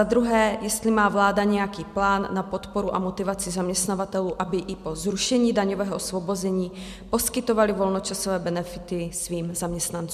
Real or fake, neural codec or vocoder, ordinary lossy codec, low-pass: real; none; MP3, 96 kbps; 14.4 kHz